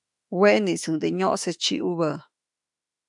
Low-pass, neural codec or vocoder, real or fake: 10.8 kHz; autoencoder, 48 kHz, 32 numbers a frame, DAC-VAE, trained on Japanese speech; fake